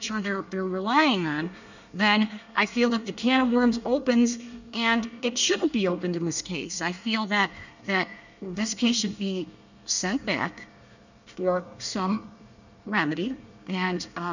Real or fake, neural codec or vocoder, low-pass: fake; codec, 24 kHz, 1 kbps, SNAC; 7.2 kHz